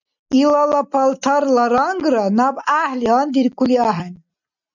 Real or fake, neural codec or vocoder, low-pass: real; none; 7.2 kHz